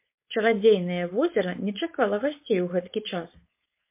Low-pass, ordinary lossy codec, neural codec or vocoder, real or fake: 3.6 kHz; MP3, 24 kbps; codec, 16 kHz, 4.8 kbps, FACodec; fake